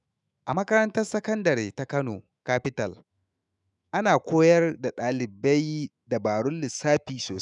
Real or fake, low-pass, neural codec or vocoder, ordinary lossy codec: fake; 10.8 kHz; autoencoder, 48 kHz, 128 numbers a frame, DAC-VAE, trained on Japanese speech; none